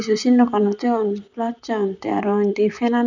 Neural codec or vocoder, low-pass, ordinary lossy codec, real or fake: vocoder, 44.1 kHz, 128 mel bands, Pupu-Vocoder; 7.2 kHz; none; fake